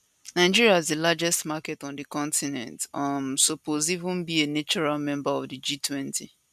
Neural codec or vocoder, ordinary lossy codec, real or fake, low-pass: none; none; real; 14.4 kHz